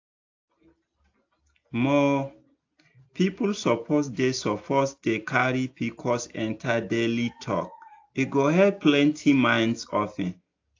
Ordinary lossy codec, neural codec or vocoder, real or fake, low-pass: AAC, 48 kbps; none; real; 7.2 kHz